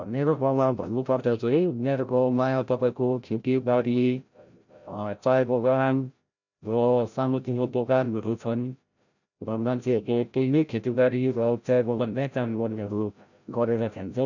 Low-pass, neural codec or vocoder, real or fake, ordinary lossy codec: 7.2 kHz; codec, 16 kHz, 0.5 kbps, FreqCodec, larger model; fake; none